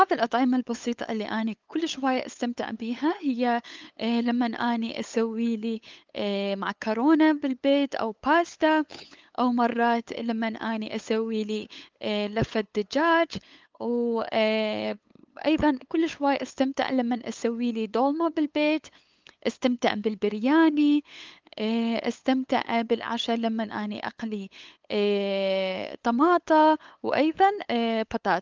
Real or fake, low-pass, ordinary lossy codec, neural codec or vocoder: fake; 7.2 kHz; Opus, 32 kbps; codec, 16 kHz, 8 kbps, FunCodec, trained on LibriTTS, 25 frames a second